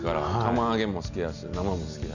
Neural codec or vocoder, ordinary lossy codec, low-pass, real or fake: none; none; 7.2 kHz; real